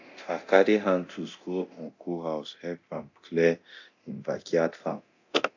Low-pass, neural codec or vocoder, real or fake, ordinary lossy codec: 7.2 kHz; codec, 24 kHz, 0.9 kbps, DualCodec; fake; AAC, 48 kbps